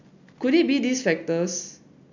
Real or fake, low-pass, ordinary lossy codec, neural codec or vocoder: real; 7.2 kHz; none; none